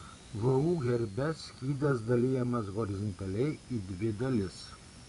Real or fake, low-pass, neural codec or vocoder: fake; 10.8 kHz; vocoder, 24 kHz, 100 mel bands, Vocos